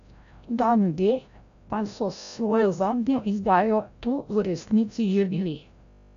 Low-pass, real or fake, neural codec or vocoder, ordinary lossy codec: 7.2 kHz; fake; codec, 16 kHz, 0.5 kbps, FreqCodec, larger model; none